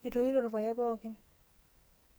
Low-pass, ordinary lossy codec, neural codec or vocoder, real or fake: none; none; codec, 44.1 kHz, 2.6 kbps, SNAC; fake